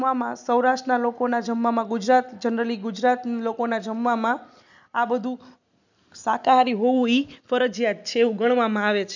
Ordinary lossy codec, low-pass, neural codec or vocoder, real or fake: none; 7.2 kHz; none; real